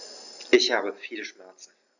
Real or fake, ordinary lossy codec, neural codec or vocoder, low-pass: real; none; none; 7.2 kHz